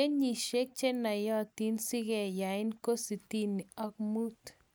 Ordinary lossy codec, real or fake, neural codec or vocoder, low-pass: none; real; none; none